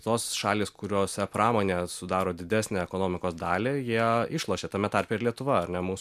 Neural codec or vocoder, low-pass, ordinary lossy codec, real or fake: none; 14.4 kHz; MP3, 96 kbps; real